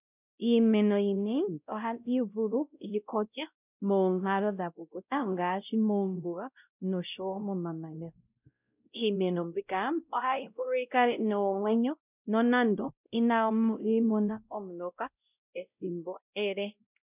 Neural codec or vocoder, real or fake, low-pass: codec, 16 kHz, 0.5 kbps, X-Codec, WavLM features, trained on Multilingual LibriSpeech; fake; 3.6 kHz